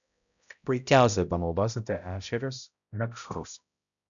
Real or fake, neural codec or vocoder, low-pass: fake; codec, 16 kHz, 0.5 kbps, X-Codec, HuBERT features, trained on balanced general audio; 7.2 kHz